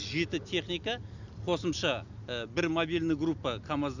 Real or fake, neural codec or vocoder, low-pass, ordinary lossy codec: real; none; 7.2 kHz; none